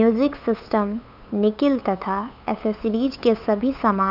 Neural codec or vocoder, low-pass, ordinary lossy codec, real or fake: codec, 16 kHz, 8 kbps, FunCodec, trained on LibriTTS, 25 frames a second; 5.4 kHz; none; fake